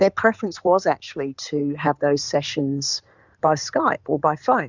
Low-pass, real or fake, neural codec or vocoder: 7.2 kHz; fake; codec, 16 kHz in and 24 kHz out, 2.2 kbps, FireRedTTS-2 codec